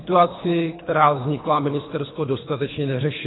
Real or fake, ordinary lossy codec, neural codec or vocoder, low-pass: fake; AAC, 16 kbps; codec, 24 kHz, 3 kbps, HILCodec; 7.2 kHz